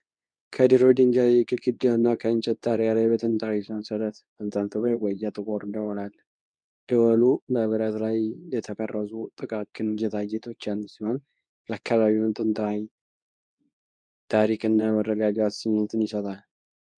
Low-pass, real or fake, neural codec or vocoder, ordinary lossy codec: 9.9 kHz; fake; codec, 24 kHz, 0.9 kbps, WavTokenizer, medium speech release version 2; MP3, 96 kbps